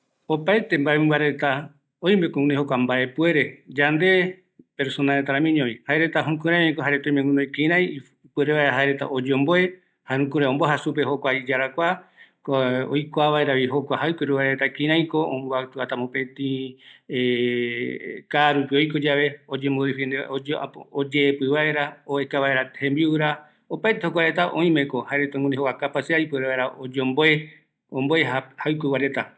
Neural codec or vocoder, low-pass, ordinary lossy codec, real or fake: none; none; none; real